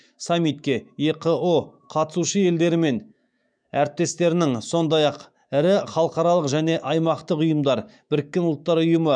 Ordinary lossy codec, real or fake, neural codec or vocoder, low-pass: none; fake; autoencoder, 48 kHz, 128 numbers a frame, DAC-VAE, trained on Japanese speech; 9.9 kHz